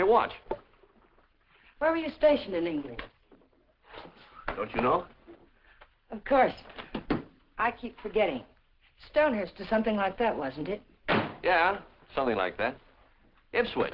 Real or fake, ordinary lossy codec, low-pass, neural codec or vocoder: real; Opus, 16 kbps; 5.4 kHz; none